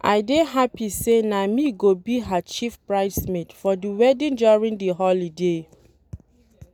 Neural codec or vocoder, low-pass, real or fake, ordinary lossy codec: none; 19.8 kHz; real; none